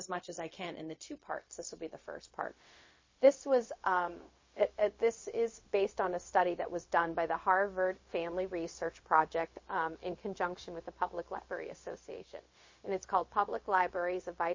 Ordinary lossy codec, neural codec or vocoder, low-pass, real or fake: MP3, 32 kbps; codec, 16 kHz, 0.4 kbps, LongCat-Audio-Codec; 7.2 kHz; fake